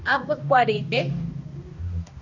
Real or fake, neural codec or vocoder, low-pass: fake; codec, 16 kHz, 1 kbps, X-Codec, HuBERT features, trained on balanced general audio; 7.2 kHz